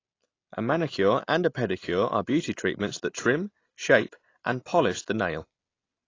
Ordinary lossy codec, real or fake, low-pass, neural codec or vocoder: AAC, 32 kbps; real; 7.2 kHz; none